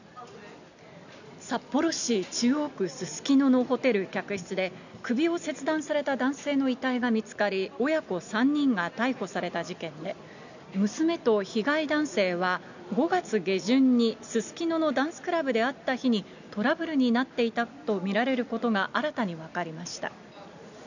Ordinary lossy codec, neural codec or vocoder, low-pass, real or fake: none; none; 7.2 kHz; real